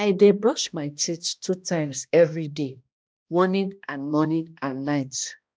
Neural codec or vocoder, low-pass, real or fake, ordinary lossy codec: codec, 16 kHz, 1 kbps, X-Codec, HuBERT features, trained on balanced general audio; none; fake; none